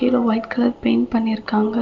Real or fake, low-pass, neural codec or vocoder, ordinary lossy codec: real; 7.2 kHz; none; Opus, 32 kbps